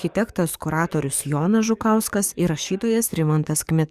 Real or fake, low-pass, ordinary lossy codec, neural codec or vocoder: fake; 14.4 kHz; Opus, 64 kbps; codec, 44.1 kHz, 7.8 kbps, DAC